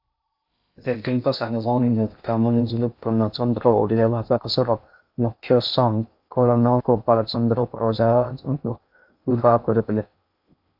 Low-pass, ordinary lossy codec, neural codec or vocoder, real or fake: 5.4 kHz; AAC, 48 kbps; codec, 16 kHz in and 24 kHz out, 0.6 kbps, FocalCodec, streaming, 2048 codes; fake